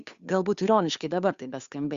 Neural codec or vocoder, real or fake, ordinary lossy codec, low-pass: codec, 16 kHz, 2 kbps, FunCodec, trained on Chinese and English, 25 frames a second; fake; Opus, 64 kbps; 7.2 kHz